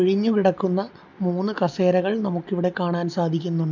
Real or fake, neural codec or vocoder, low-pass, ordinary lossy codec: real; none; 7.2 kHz; none